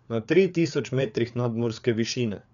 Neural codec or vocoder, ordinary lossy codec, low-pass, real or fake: codec, 16 kHz, 4 kbps, FreqCodec, larger model; none; 7.2 kHz; fake